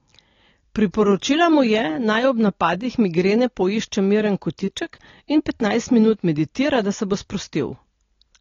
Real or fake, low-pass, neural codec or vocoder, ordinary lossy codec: real; 7.2 kHz; none; AAC, 32 kbps